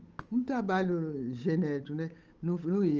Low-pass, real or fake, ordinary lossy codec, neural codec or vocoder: 7.2 kHz; fake; Opus, 24 kbps; codec, 16 kHz, 8 kbps, FunCodec, trained on Chinese and English, 25 frames a second